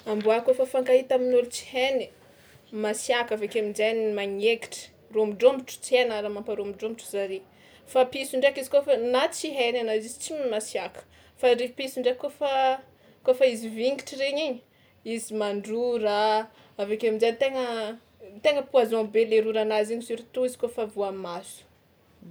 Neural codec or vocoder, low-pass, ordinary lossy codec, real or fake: none; none; none; real